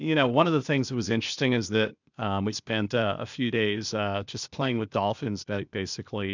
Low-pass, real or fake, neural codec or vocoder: 7.2 kHz; fake; codec, 16 kHz, 0.8 kbps, ZipCodec